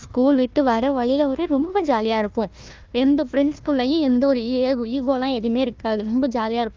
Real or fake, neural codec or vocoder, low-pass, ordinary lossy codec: fake; codec, 16 kHz, 1 kbps, FunCodec, trained on Chinese and English, 50 frames a second; 7.2 kHz; Opus, 24 kbps